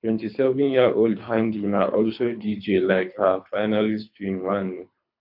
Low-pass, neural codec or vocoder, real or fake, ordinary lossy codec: 5.4 kHz; codec, 24 kHz, 3 kbps, HILCodec; fake; AAC, 48 kbps